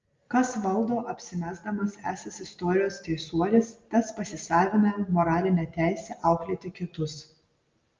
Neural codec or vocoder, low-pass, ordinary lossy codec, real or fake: none; 7.2 kHz; Opus, 32 kbps; real